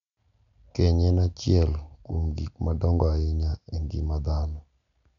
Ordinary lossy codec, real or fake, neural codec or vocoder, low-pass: none; real; none; 7.2 kHz